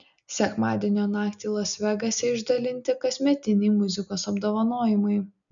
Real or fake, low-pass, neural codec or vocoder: real; 7.2 kHz; none